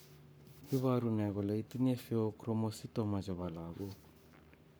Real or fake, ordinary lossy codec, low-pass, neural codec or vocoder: fake; none; none; codec, 44.1 kHz, 7.8 kbps, Pupu-Codec